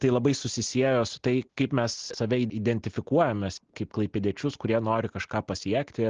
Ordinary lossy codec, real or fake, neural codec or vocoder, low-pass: Opus, 16 kbps; real; none; 7.2 kHz